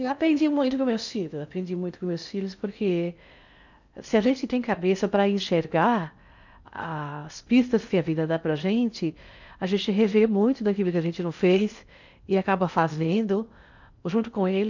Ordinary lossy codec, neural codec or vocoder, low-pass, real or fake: none; codec, 16 kHz in and 24 kHz out, 0.6 kbps, FocalCodec, streaming, 4096 codes; 7.2 kHz; fake